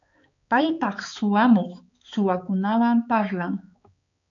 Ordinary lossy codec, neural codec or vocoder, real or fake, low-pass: MP3, 64 kbps; codec, 16 kHz, 4 kbps, X-Codec, HuBERT features, trained on balanced general audio; fake; 7.2 kHz